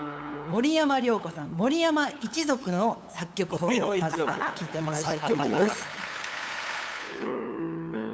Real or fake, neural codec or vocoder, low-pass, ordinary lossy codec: fake; codec, 16 kHz, 8 kbps, FunCodec, trained on LibriTTS, 25 frames a second; none; none